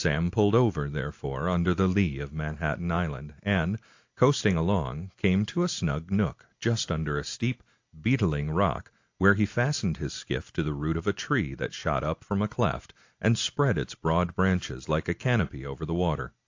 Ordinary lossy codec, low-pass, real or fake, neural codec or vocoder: AAC, 48 kbps; 7.2 kHz; real; none